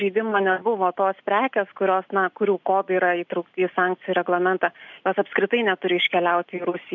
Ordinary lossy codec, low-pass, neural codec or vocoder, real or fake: MP3, 64 kbps; 7.2 kHz; none; real